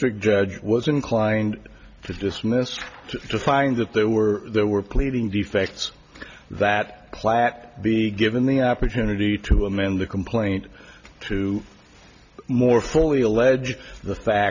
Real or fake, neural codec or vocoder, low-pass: real; none; 7.2 kHz